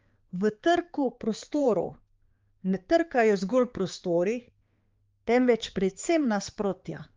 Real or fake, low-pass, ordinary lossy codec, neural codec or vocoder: fake; 7.2 kHz; Opus, 32 kbps; codec, 16 kHz, 4 kbps, X-Codec, HuBERT features, trained on balanced general audio